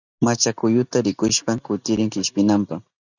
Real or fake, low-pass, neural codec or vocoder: real; 7.2 kHz; none